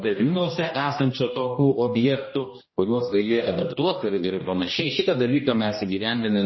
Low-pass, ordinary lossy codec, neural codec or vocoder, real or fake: 7.2 kHz; MP3, 24 kbps; codec, 16 kHz, 1 kbps, X-Codec, HuBERT features, trained on general audio; fake